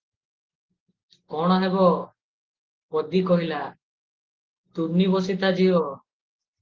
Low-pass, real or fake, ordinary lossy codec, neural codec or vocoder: 7.2 kHz; real; Opus, 16 kbps; none